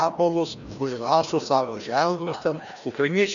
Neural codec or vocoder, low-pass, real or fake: codec, 16 kHz, 1 kbps, FreqCodec, larger model; 7.2 kHz; fake